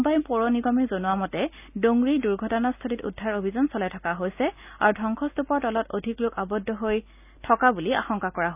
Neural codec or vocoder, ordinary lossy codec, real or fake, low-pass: none; none; real; 3.6 kHz